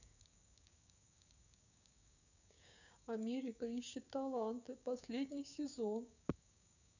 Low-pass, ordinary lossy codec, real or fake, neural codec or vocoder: 7.2 kHz; none; fake; codec, 16 kHz, 6 kbps, DAC